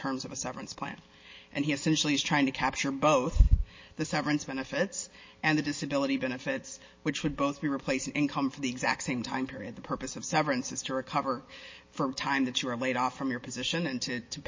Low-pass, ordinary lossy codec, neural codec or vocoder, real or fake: 7.2 kHz; MP3, 32 kbps; none; real